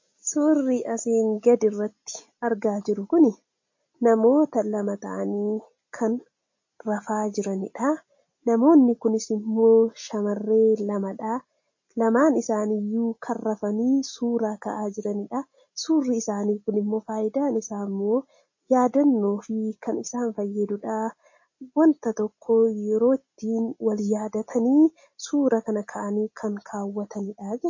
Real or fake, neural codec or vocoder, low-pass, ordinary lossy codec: real; none; 7.2 kHz; MP3, 32 kbps